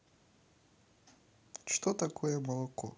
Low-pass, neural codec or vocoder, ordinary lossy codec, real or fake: none; none; none; real